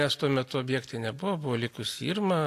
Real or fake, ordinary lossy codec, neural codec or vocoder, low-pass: real; AAC, 64 kbps; none; 14.4 kHz